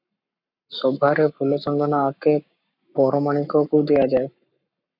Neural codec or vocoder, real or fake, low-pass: codec, 44.1 kHz, 7.8 kbps, Pupu-Codec; fake; 5.4 kHz